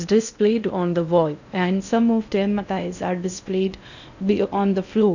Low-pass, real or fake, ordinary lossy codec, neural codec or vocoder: 7.2 kHz; fake; none; codec, 16 kHz in and 24 kHz out, 0.6 kbps, FocalCodec, streaming, 2048 codes